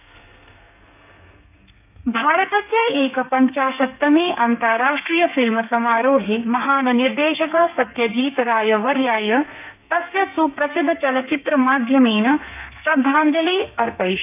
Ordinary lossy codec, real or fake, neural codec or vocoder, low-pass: none; fake; codec, 32 kHz, 1.9 kbps, SNAC; 3.6 kHz